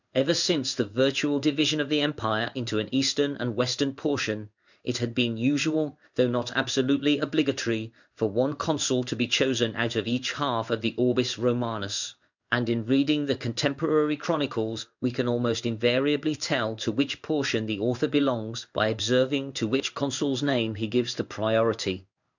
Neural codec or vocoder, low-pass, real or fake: codec, 16 kHz in and 24 kHz out, 1 kbps, XY-Tokenizer; 7.2 kHz; fake